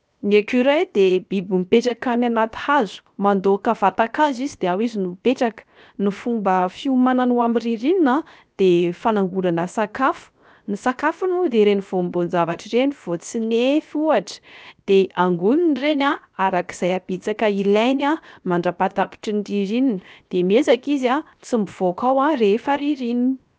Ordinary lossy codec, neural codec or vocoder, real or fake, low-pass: none; codec, 16 kHz, 0.7 kbps, FocalCodec; fake; none